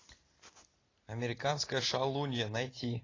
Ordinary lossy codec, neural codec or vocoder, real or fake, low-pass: AAC, 32 kbps; none; real; 7.2 kHz